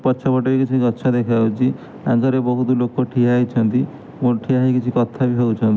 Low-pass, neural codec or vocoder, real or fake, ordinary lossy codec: none; none; real; none